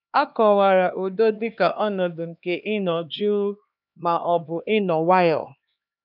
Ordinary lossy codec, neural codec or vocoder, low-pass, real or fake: none; codec, 16 kHz, 2 kbps, X-Codec, HuBERT features, trained on LibriSpeech; 5.4 kHz; fake